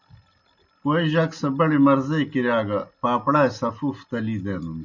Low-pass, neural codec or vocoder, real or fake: 7.2 kHz; none; real